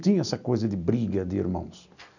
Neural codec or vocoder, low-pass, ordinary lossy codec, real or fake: none; 7.2 kHz; none; real